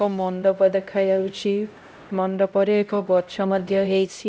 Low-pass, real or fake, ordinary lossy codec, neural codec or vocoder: none; fake; none; codec, 16 kHz, 0.5 kbps, X-Codec, HuBERT features, trained on LibriSpeech